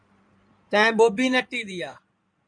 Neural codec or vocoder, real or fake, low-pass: codec, 16 kHz in and 24 kHz out, 2.2 kbps, FireRedTTS-2 codec; fake; 9.9 kHz